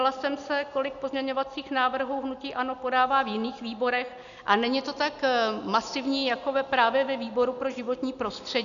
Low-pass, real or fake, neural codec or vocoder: 7.2 kHz; real; none